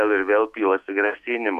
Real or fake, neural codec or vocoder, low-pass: fake; autoencoder, 48 kHz, 128 numbers a frame, DAC-VAE, trained on Japanese speech; 14.4 kHz